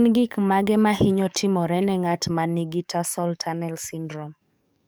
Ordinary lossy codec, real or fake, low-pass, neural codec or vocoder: none; fake; none; codec, 44.1 kHz, 7.8 kbps, DAC